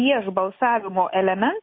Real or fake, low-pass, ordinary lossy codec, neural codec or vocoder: real; 5.4 kHz; MP3, 24 kbps; none